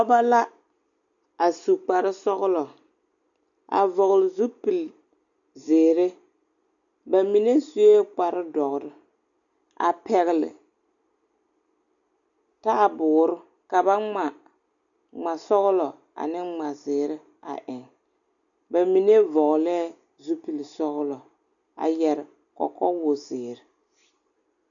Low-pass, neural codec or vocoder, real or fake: 7.2 kHz; none; real